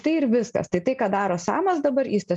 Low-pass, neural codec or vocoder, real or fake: 10.8 kHz; none; real